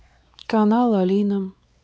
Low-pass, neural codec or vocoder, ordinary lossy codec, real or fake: none; codec, 16 kHz, 4 kbps, X-Codec, WavLM features, trained on Multilingual LibriSpeech; none; fake